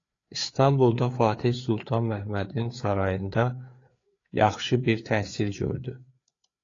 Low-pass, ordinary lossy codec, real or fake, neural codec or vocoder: 7.2 kHz; AAC, 32 kbps; fake; codec, 16 kHz, 4 kbps, FreqCodec, larger model